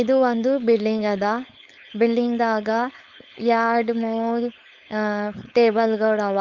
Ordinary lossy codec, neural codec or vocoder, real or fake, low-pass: Opus, 32 kbps; codec, 16 kHz, 4.8 kbps, FACodec; fake; 7.2 kHz